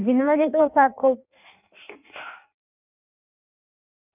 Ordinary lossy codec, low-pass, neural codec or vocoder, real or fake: none; 3.6 kHz; codec, 16 kHz in and 24 kHz out, 1.1 kbps, FireRedTTS-2 codec; fake